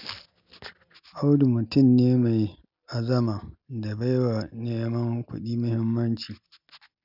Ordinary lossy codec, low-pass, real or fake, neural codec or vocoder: none; 5.4 kHz; real; none